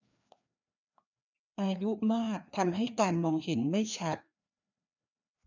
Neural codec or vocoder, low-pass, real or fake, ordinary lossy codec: codec, 16 kHz, 2 kbps, FreqCodec, larger model; 7.2 kHz; fake; none